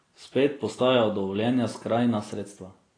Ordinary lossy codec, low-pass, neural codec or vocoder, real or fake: AAC, 32 kbps; 9.9 kHz; none; real